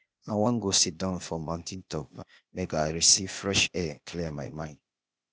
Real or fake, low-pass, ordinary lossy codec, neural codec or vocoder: fake; none; none; codec, 16 kHz, 0.8 kbps, ZipCodec